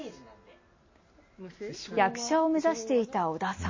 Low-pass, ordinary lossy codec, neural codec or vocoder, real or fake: 7.2 kHz; MP3, 32 kbps; none; real